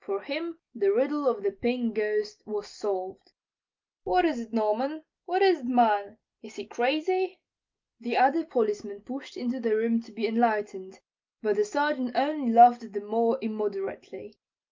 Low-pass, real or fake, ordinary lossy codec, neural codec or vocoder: 7.2 kHz; real; Opus, 24 kbps; none